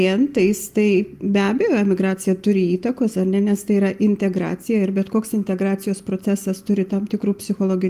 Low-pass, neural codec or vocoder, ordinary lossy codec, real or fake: 14.4 kHz; none; Opus, 32 kbps; real